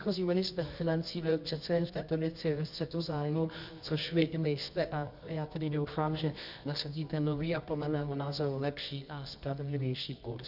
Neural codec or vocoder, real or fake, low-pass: codec, 24 kHz, 0.9 kbps, WavTokenizer, medium music audio release; fake; 5.4 kHz